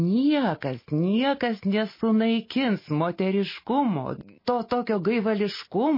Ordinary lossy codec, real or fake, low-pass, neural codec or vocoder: MP3, 24 kbps; real; 5.4 kHz; none